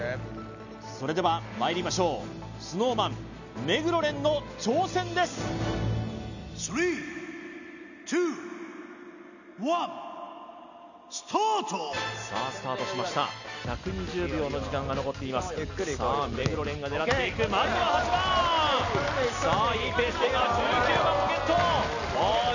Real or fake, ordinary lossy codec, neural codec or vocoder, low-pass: real; none; none; 7.2 kHz